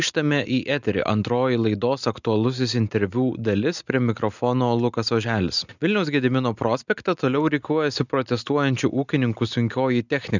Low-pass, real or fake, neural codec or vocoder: 7.2 kHz; real; none